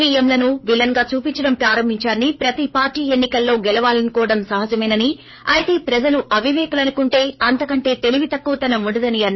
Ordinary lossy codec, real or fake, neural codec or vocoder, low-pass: MP3, 24 kbps; fake; codec, 16 kHz, 4 kbps, FreqCodec, larger model; 7.2 kHz